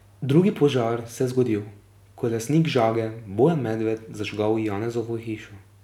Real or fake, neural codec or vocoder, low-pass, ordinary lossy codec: real; none; 19.8 kHz; none